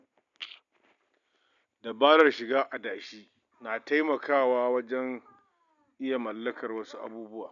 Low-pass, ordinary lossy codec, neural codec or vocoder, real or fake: 7.2 kHz; none; none; real